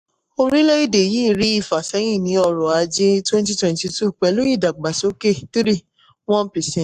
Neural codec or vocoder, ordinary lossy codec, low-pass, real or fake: codec, 44.1 kHz, 7.8 kbps, Pupu-Codec; AAC, 96 kbps; 14.4 kHz; fake